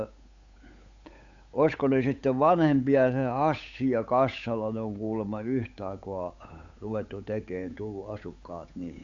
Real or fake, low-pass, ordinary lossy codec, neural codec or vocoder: fake; 7.2 kHz; AAC, 64 kbps; codec, 16 kHz, 8 kbps, FunCodec, trained on Chinese and English, 25 frames a second